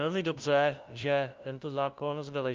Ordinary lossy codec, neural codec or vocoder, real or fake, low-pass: Opus, 24 kbps; codec, 16 kHz, 0.5 kbps, FunCodec, trained on LibriTTS, 25 frames a second; fake; 7.2 kHz